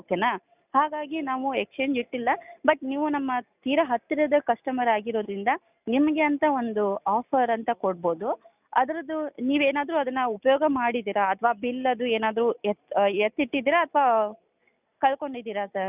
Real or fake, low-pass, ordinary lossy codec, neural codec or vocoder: real; 3.6 kHz; none; none